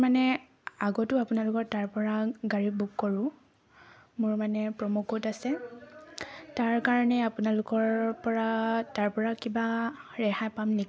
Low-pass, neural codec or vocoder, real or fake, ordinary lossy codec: none; none; real; none